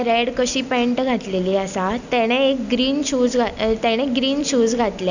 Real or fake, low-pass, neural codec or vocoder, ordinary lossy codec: real; 7.2 kHz; none; none